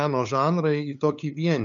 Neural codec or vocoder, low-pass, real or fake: codec, 16 kHz, 8 kbps, FunCodec, trained on LibriTTS, 25 frames a second; 7.2 kHz; fake